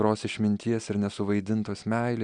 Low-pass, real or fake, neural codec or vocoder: 9.9 kHz; real; none